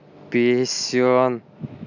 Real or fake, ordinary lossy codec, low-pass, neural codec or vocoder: real; none; 7.2 kHz; none